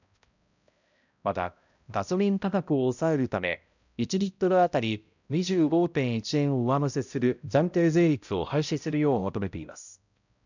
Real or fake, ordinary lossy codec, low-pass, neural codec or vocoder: fake; none; 7.2 kHz; codec, 16 kHz, 0.5 kbps, X-Codec, HuBERT features, trained on balanced general audio